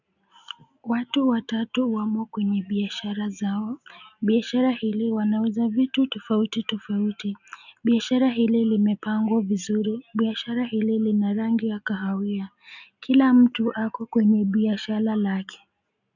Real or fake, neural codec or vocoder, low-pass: real; none; 7.2 kHz